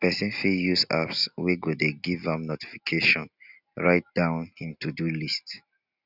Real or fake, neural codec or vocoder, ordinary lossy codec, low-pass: real; none; none; 5.4 kHz